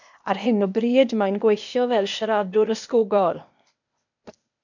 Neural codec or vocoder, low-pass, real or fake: codec, 16 kHz, 0.8 kbps, ZipCodec; 7.2 kHz; fake